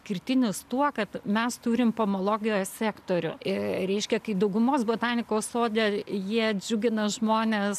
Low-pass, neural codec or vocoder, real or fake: 14.4 kHz; none; real